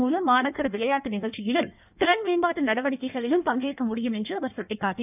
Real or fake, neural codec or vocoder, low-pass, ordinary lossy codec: fake; codec, 16 kHz in and 24 kHz out, 1.1 kbps, FireRedTTS-2 codec; 3.6 kHz; none